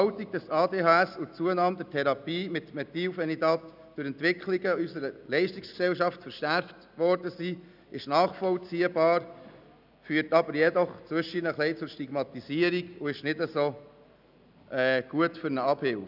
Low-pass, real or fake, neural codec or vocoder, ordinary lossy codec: 5.4 kHz; real; none; none